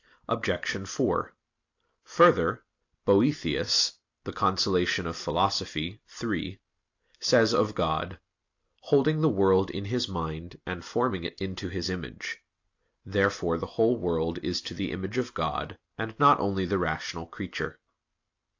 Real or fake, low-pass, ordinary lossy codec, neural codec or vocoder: real; 7.2 kHz; AAC, 48 kbps; none